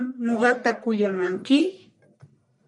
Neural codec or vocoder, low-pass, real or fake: codec, 44.1 kHz, 1.7 kbps, Pupu-Codec; 10.8 kHz; fake